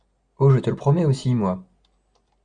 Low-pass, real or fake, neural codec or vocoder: 9.9 kHz; real; none